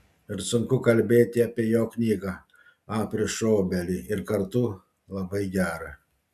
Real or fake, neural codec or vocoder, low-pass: real; none; 14.4 kHz